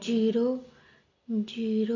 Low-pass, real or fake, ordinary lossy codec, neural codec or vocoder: 7.2 kHz; real; AAC, 32 kbps; none